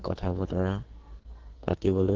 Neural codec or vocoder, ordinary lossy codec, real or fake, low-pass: codec, 44.1 kHz, 2.6 kbps, SNAC; Opus, 16 kbps; fake; 7.2 kHz